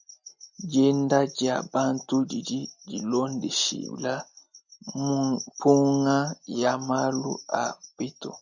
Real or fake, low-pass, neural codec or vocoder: real; 7.2 kHz; none